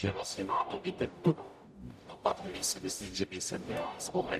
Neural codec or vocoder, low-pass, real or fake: codec, 44.1 kHz, 0.9 kbps, DAC; 14.4 kHz; fake